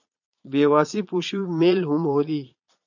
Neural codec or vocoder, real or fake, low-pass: vocoder, 22.05 kHz, 80 mel bands, Vocos; fake; 7.2 kHz